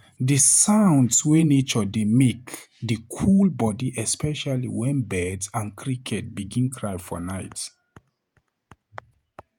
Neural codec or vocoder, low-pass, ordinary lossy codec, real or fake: vocoder, 48 kHz, 128 mel bands, Vocos; none; none; fake